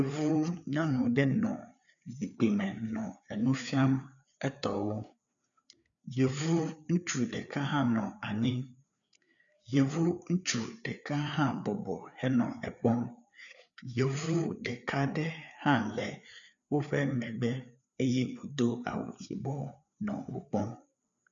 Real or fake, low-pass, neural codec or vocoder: fake; 7.2 kHz; codec, 16 kHz, 4 kbps, FreqCodec, larger model